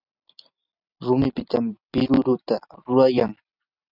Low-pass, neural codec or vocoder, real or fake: 5.4 kHz; vocoder, 44.1 kHz, 128 mel bands every 256 samples, BigVGAN v2; fake